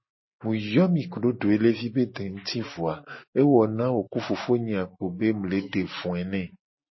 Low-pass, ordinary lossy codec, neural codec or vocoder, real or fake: 7.2 kHz; MP3, 24 kbps; none; real